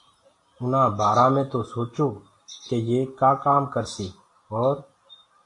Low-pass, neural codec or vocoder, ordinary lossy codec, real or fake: 10.8 kHz; none; AAC, 48 kbps; real